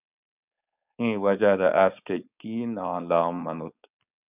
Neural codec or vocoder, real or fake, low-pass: codec, 16 kHz, 4.8 kbps, FACodec; fake; 3.6 kHz